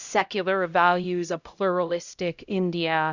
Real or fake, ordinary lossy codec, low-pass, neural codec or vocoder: fake; Opus, 64 kbps; 7.2 kHz; codec, 16 kHz, 0.5 kbps, X-Codec, HuBERT features, trained on LibriSpeech